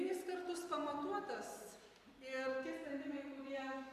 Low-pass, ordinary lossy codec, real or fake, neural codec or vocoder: 14.4 kHz; AAC, 64 kbps; fake; vocoder, 44.1 kHz, 128 mel bands every 256 samples, BigVGAN v2